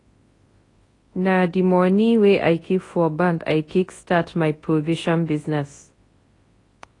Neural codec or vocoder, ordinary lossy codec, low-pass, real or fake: codec, 24 kHz, 0.9 kbps, WavTokenizer, large speech release; AAC, 32 kbps; 10.8 kHz; fake